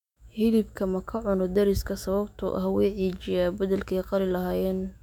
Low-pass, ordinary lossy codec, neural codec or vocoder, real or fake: 19.8 kHz; none; autoencoder, 48 kHz, 128 numbers a frame, DAC-VAE, trained on Japanese speech; fake